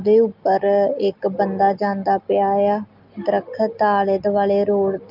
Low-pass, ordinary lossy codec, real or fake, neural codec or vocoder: 5.4 kHz; Opus, 24 kbps; real; none